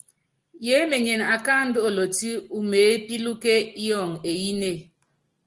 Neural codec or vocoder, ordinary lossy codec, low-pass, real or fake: none; Opus, 24 kbps; 10.8 kHz; real